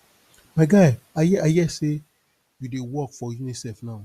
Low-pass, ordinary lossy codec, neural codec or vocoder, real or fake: 14.4 kHz; Opus, 64 kbps; none; real